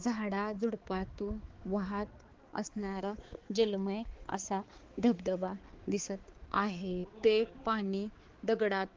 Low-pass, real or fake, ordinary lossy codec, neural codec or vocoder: 7.2 kHz; fake; Opus, 16 kbps; codec, 16 kHz, 4 kbps, X-Codec, HuBERT features, trained on balanced general audio